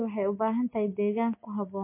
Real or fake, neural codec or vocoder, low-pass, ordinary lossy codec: real; none; 3.6 kHz; MP3, 24 kbps